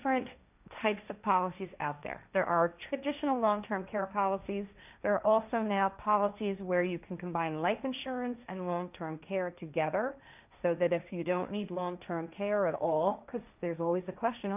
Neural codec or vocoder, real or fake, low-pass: codec, 16 kHz, 1.1 kbps, Voila-Tokenizer; fake; 3.6 kHz